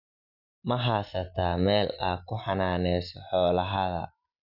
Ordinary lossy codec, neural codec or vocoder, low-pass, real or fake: none; none; 5.4 kHz; real